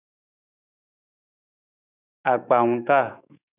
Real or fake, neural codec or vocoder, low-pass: fake; autoencoder, 48 kHz, 128 numbers a frame, DAC-VAE, trained on Japanese speech; 3.6 kHz